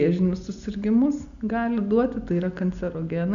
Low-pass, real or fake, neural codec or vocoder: 7.2 kHz; real; none